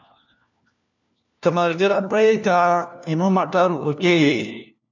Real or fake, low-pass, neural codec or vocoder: fake; 7.2 kHz; codec, 16 kHz, 1 kbps, FunCodec, trained on LibriTTS, 50 frames a second